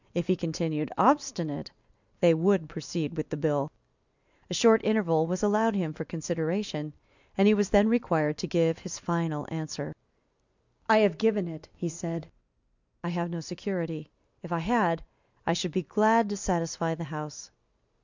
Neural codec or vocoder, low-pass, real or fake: none; 7.2 kHz; real